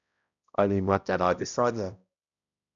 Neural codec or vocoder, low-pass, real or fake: codec, 16 kHz, 0.5 kbps, X-Codec, HuBERT features, trained on balanced general audio; 7.2 kHz; fake